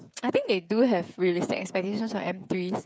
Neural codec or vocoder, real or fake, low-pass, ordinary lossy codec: codec, 16 kHz, 8 kbps, FreqCodec, smaller model; fake; none; none